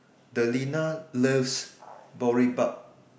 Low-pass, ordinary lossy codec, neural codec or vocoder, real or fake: none; none; none; real